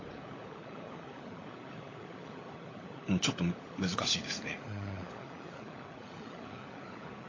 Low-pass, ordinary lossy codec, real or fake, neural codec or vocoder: 7.2 kHz; AAC, 32 kbps; fake; codec, 16 kHz, 16 kbps, FunCodec, trained on LibriTTS, 50 frames a second